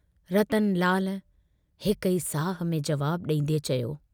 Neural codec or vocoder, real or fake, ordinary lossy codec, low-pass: none; real; none; none